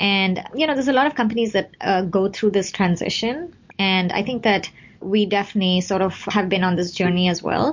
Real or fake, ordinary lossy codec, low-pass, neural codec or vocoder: real; MP3, 48 kbps; 7.2 kHz; none